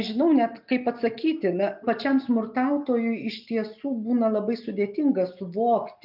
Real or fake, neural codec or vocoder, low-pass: real; none; 5.4 kHz